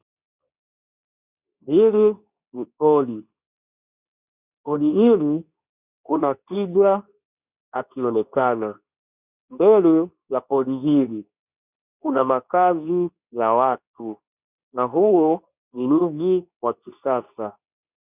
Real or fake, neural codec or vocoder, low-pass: fake; codec, 24 kHz, 0.9 kbps, WavTokenizer, medium speech release version 2; 3.6 kHz